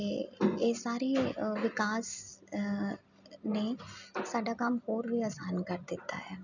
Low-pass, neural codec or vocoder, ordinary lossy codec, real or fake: 7.2 kHz; none; none; real